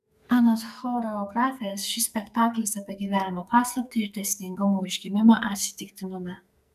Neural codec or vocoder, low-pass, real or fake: codec, 44.1 kHz, 2.6 kbps, SNAC; 14.4 kHz; fake